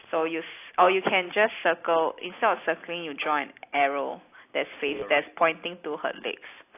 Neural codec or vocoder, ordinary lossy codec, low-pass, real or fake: none; AAC, 24 kbps; 3.6 kHz; real